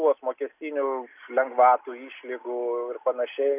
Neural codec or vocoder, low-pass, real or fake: none; 3.6 kHz; real